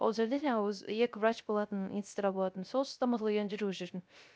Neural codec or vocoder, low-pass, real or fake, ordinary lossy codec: codec, 16 kHz, 0.3 kbps, FocalCodec; none; fake; none